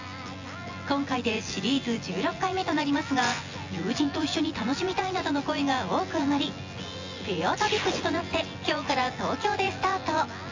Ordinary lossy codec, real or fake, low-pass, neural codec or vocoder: AAC, 48 kbps; fake; 7.2 kHz; vocoder, 24 kHz, 100 mel bands, Vocos